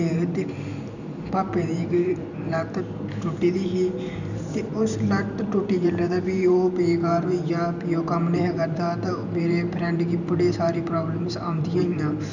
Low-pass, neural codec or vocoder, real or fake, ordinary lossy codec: 7.2 kHz; vocoder, 44.1 kHz, 128 mel bands every 512 samples, BigVGAN v2; fake; none